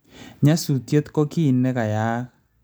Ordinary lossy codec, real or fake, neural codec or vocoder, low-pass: none; real; none; none